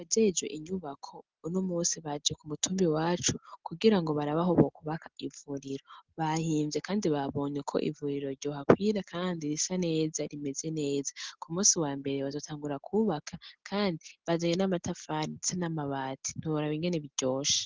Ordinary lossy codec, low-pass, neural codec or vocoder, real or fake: Opus, 16 kbps; 7.2 kHz; none; real